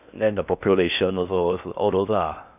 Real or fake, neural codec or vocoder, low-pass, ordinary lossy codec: fake; codec, 16 kHz in and 24 kHz out, 0.6 kbps, FocalCodec, streaming, 4096 codes; 3.6 kHz; none